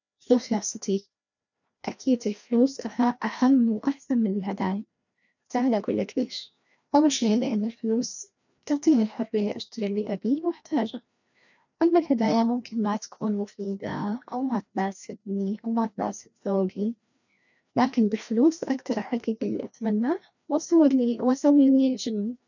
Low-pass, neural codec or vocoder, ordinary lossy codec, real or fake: 7.2 kHz; codec, 16 kHz, 1 kbps, FreqCodec, larger model; none; fake